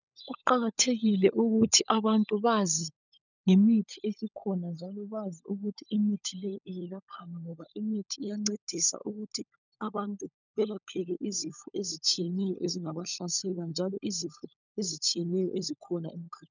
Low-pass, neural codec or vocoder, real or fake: 7.2 kHz; codec, 16 kHz, 16 kbps, FunCodec, trained on LibriTTS, 50 frames a second; fake